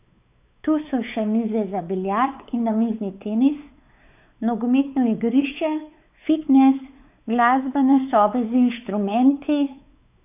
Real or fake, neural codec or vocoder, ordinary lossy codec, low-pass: fake; codec, 16 kHz, 4 kbps, X-Codec, WavLM features, trained on Multilingual LibriSpeech; none; 3.6 kHz